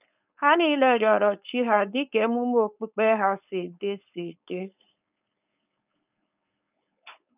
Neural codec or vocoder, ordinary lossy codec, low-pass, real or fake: codec, 16 kHz, 4.8 kbps, FACodec; none; 3.6 kHz; fake